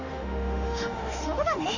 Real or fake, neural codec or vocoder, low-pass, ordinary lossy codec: fake; autoencoder, 48 kHz, 32 numbers a frame, DAC-VAE, trained on Japanese speech; 7.2 kHz; none